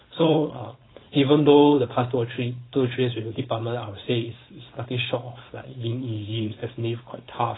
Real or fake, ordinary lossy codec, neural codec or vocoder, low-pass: fake; AAC, 16 kbps; codec, 16 kHz, 4.8 kbps, FACodec; 7.2 kHz